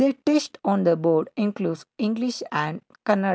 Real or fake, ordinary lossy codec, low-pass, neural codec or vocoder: real; none; none; none